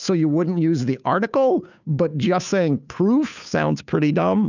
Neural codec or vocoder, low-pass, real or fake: codec, 16 kHz, 2 kbps, FunCodec, trained on Chinese and English, 25 frames a second; 7.2 kHz; fake